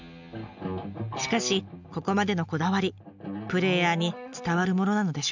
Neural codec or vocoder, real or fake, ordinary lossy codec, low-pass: none; real; none; 7.2 kHz